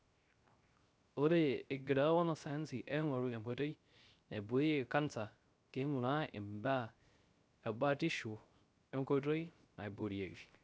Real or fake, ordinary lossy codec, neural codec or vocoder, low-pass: fake; none; codec, 16 kHz, 0.3 kbps, FocalCodec; none